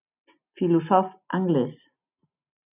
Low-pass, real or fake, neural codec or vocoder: 3.6 kHz; real; none